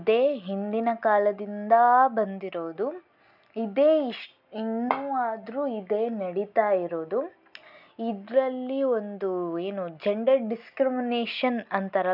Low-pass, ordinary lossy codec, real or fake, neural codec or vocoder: 5.4 kHz; none; real; none